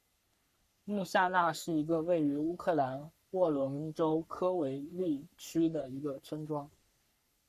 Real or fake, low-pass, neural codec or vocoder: fake; 14.4 kHz; codec, 44.1 kHz, 3.4 kbps, Pupu-Codec